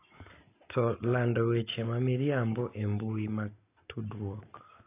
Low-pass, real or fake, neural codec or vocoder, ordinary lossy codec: 3.6 kHz; real; none; AAC, 24 kbps